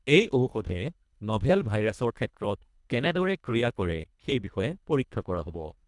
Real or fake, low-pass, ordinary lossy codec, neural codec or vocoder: fake; 10.8 kHz; none; codec, 24 kHz, 1.5 kbps, HILCodec